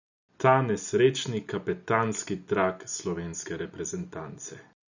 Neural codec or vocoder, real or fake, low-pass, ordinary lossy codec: none; real; 7.2 kHz; none